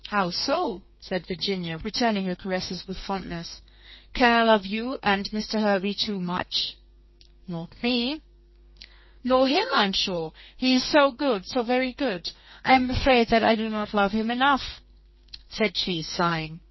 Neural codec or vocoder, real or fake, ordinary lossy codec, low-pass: codec, 32 kHz, 1.9 kbps, SNAC; fake; MP3, 24 kbps; 7.2 kHz